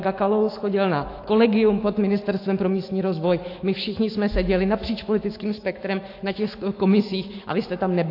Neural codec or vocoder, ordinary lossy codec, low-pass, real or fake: autoencoder, 48 kHz, 128 numbers a frame, DAC-VAE, trained on Japanese speech; AAC, 32 kbps; 5.4 kHz; fake